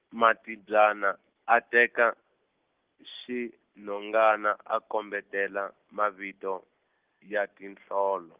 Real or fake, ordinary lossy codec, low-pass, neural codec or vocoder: real; Opus, 16 kbps; 3.6 kHz; none